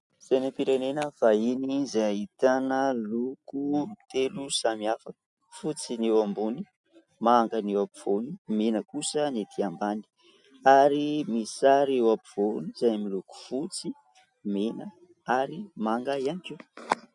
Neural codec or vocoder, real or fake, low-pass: none; real; 10.8 kHz